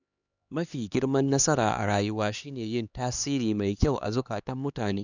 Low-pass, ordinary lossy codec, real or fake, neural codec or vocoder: 7.2 kHz; none; fake; codec, 16 kHz, 2 kbps, X-Codec, HuBERT features, trained on LibriSpeech